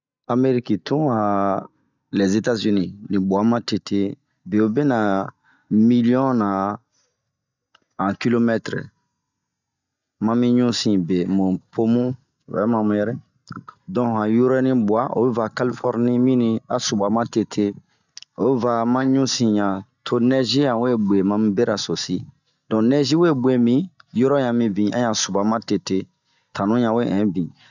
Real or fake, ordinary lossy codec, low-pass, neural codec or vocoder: real; none; 7.2 kHz; none